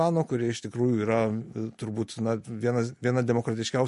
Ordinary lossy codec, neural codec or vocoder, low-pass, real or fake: MP3, 48 kbps; none; 14.4 kHz; real